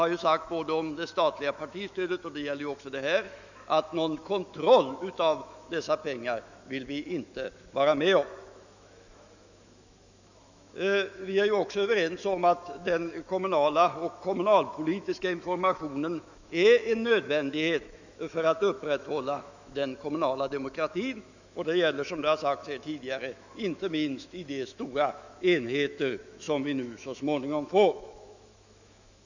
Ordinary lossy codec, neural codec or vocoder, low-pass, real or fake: none; autoencoder, 48 kHz, 128 numbers a frame, DAC-VAE, trained on Japanese speech; 7.2 kHz; fake